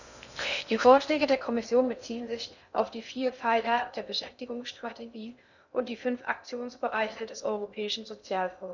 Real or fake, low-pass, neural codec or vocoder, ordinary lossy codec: fake; 7.2 kHz; codec, 16 kHz in and 24 kHz out, 0.6 kbps, FocalCodec, streaming, 2048 codes; none